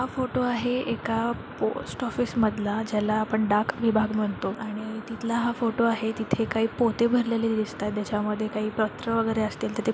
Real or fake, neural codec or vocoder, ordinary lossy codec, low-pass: real; none; none; none